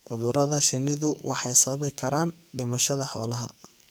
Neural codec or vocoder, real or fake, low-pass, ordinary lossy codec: codec, 44.1 kHz, 2.6 kbps, SNAC; fake; none; none